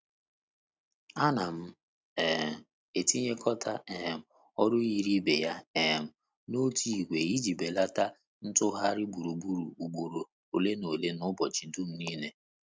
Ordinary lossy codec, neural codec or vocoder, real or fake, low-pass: none; none; real; none